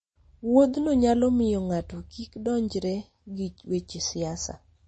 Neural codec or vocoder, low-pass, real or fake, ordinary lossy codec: none; 10.8 kHz; real; MP3, 32 kbps